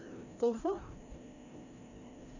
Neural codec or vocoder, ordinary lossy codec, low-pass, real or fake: codec, 16 kHz, 1 kbps, FreqCodec, larger model; Opus, 64 kbps; 7.2 kHz; fake